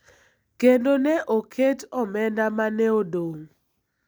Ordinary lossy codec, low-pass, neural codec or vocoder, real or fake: none; none; none; real